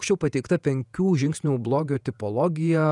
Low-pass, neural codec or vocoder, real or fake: 10.8 kHz; vocoder, 48 kHz, 128 mel bands, Vocos; fake